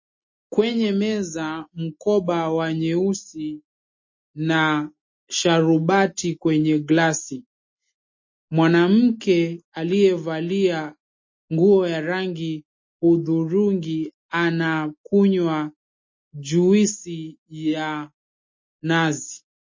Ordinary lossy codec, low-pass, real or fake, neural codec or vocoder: MP3, 32 kbps; 7.2 kHz; real; none